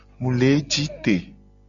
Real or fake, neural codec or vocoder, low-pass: real; none; 7.2 kHz